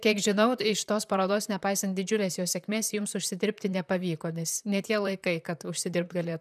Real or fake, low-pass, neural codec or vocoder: fake; 14.4 kHz; vocoder, 44.1 kHz, 128 mel bands, Pupu-Vocoder